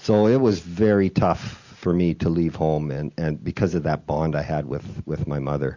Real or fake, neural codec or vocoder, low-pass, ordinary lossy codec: real; none; 7.2 kHz; Opus, 64 kbps